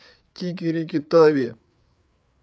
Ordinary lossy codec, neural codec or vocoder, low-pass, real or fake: none; codec, 16 kHz, 4 kbps, FreqCodec, larger model; none; fake